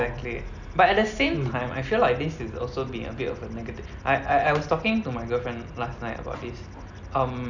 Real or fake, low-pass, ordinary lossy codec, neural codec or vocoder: real; 7.2 kHz; none; none